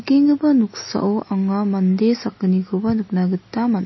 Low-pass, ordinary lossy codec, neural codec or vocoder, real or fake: 7.2 kHz; MP3, 24 kbps; none; real